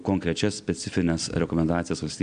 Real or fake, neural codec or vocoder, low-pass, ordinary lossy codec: real; none; 9.9 kHz; MP3, 96 kbps